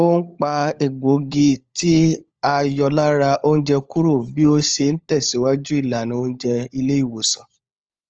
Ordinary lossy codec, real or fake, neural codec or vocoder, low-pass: Opus, 24 kbps; fake; codec, 16 kHz, 16 kbps, FunCodec, trained on LibriTTS, 50 frames a second; 7.2 kHz